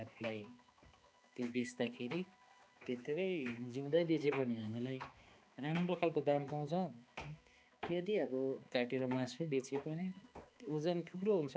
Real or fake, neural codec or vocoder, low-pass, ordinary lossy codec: fake; codec, 16 kHz, 2 kbps, X-Codec, HuBERT features, trained on balanced general audio; none; none